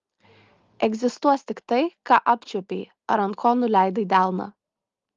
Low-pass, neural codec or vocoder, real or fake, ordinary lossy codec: 7.2 kHz; none; real; Opus, 32 kbps